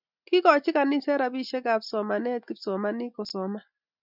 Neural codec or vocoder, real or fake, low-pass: none; real; 5.4 kHz